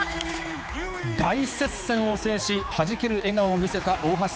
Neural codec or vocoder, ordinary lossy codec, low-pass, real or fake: codec, 16 kHz, 4 kbps, X-Codec, HuBERT features, trained on general audio; none; none; fake